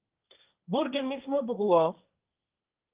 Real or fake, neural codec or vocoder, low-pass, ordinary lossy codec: fake; codec, 16 kHz, 1.1 kbps, Voila-Tokenizer; 3.6 kHz; Opus, 24 kbps